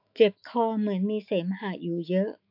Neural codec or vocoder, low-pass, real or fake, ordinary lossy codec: autoencoder, 48 kHz, 128 numbers a frame, DAC-VAE, trained on Japanese speech; 5.4 kHz; fake; AAC, 48 kbps